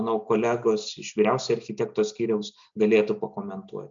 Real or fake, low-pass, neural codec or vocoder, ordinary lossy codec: real; 7.2 kHz; none; MP3, 64 kbps